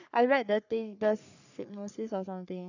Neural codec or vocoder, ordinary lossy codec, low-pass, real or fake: codec, 44.1 kHz, 3.4 kbps, Pupu-Codec; none; 7.2 kHz; fake